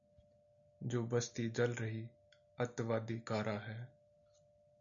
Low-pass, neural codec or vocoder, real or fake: 7.2 kHz; none; real